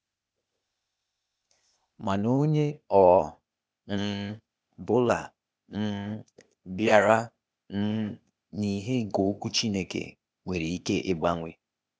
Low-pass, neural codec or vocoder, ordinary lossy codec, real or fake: none; codec, 16 kHz, 0.8 kbps, ZipCodec; none; fake